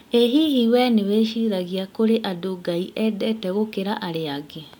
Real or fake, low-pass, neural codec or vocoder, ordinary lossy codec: real; 19.8 kHz; none; MP3, 96 kbps